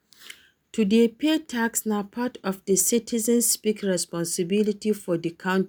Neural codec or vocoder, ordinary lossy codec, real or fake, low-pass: none; none; real; none